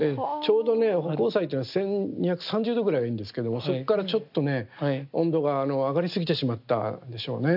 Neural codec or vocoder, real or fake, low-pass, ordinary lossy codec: none; real; 5.4 kHz; AAC, 48 kbps